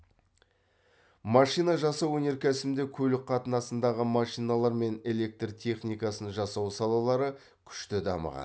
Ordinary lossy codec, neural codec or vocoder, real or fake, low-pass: none; none; real; none